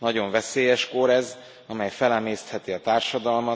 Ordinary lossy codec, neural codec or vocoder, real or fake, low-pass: none; none; real; none